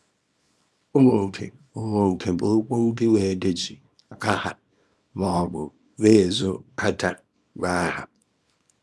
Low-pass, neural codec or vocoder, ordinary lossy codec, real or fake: none; codec, 24 kHz, 0.9 kbps, WavTokenizer, small release; none; fake